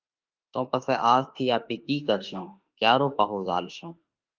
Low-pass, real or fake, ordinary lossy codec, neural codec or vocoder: 7.2 kHz; fake; Opus, 24 kbps; autoencoder, 48 kHz, 32 numbers a frame, DAC-VAE, trained on Japanese speech